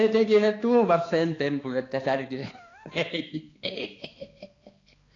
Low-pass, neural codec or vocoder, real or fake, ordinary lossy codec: 7.2 kHz; codec, 16 kHz, 2 kbps, X-Codec, HuBERT features, trained on balanced general audio; fake; AAC, 32 kbps